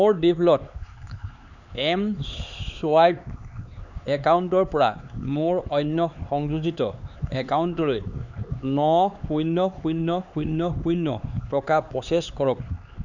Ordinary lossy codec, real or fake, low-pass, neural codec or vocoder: none; fake; 7.2 kHz; codec, 16 kHz, 4 kbps, X-Codec, HuBERT features, trained on LibriSpeech